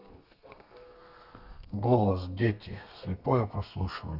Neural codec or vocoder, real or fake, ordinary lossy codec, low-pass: codec, 32 kHz, 1.9 kbps, SNAC; fake; none; 5.4 kHz